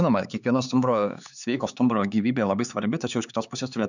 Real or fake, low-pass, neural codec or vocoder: fake; 7.2 kHz; codec, 16 kHz, 4 kbps, X-Codec, HuBERT features, trained on LibriSpeech